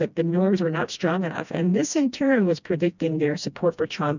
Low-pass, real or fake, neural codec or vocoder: 7.2 kHz; fake; codec, 16 kHz, 1 kbps, FreqCodec, smaller model